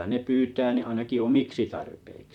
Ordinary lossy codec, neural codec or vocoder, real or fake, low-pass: none; vocoder, 44.1 kHz, 128 mel bands, Pupu-Vocoder; fake; 19.8 kHz